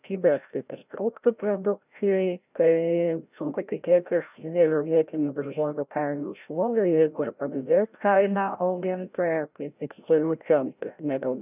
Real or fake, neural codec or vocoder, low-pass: fake; codec, 16 kHz, 0.5 kbps, FreqCodec, larger model; 3.6 kHz